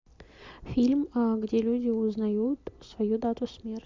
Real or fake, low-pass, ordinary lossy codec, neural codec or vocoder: real; 7.2 kHz; MP3, 64 kbps; none